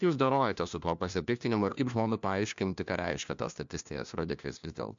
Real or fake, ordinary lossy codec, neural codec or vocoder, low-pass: fake; MP3, 64 kbps; codec, 16 kHz, 1 kbps, FunCodec, trained on LibriTTS, 50 frames a second; 7.2 kHz